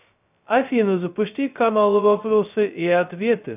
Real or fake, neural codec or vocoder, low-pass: fake; codec, 16 kHz, 0.2 kbps, FocalCodec; 3.6 kHz